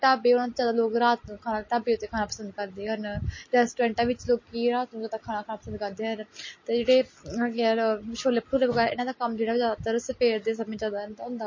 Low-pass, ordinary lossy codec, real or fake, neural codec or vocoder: 7.2 kHz; MP3, 32 kbps; real; none